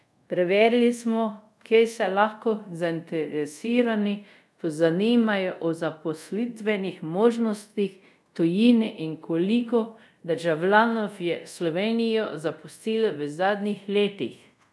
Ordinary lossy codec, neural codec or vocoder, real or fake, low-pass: none; codec, 24 kHz, 0.5 kbps, DualCodec; fake; none